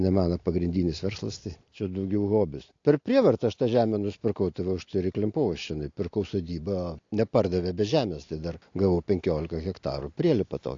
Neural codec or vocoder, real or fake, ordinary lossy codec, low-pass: none; real; AAC, 48 kbps; 7.2 kHz